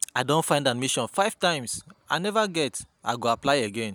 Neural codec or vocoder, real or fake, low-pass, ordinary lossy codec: none; real; none; none